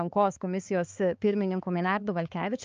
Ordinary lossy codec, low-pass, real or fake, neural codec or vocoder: Opus, 24 kbps; 7.2 kHz; fake; codec, 16 kHz, 4 kbps, X-Codec, HuBERT features, trained on balanced general audio